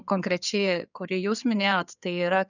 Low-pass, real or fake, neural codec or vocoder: 7.2 kHz; fake; codec, 16 kHz in and 24 kHz out, 2.2 kbps, FireRedTTS-2 codec